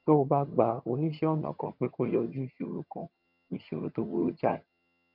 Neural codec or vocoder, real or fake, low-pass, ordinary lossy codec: vocoder, 22.05 kHz, 80 mel bands, HiFi-GAN; fake; 5.4 kHz; none